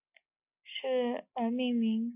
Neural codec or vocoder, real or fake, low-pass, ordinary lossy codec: none; real; 3.6 kHz; AAC, 32 kbps